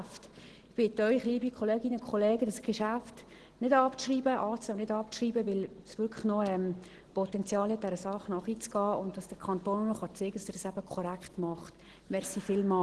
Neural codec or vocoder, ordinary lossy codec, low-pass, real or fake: none; Opus, 16 kbps; 10.8 kHz; real